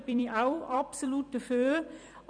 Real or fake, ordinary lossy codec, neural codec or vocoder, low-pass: fake; none; vocoder, 44.1 kHz, 128 mel bands every 256 samples, BigVGAN v2; 9.9 kHz